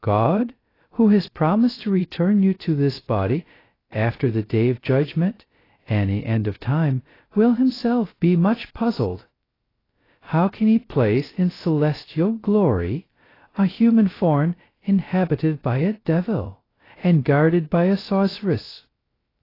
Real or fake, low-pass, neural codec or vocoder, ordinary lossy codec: fake; 5.4 kHz; codec, 16 kHz, 0.2 kbps, FocalCodec; AAC, 24 kbps